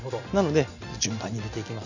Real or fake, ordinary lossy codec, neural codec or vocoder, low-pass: real; none; none; 7.2 kHz